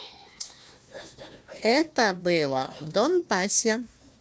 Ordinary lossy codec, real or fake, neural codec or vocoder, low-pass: none; fake; codec, 16 kHz, 1 kbps, FunCodec, trained on Chinese and English, 50 frames a second; none